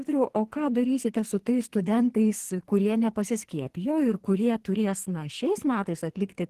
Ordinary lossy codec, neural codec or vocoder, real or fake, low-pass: Opus, 16 kbps; codec, 44.1 kHz, 2.6 kbps, SNAC; fake; 14.4 kHz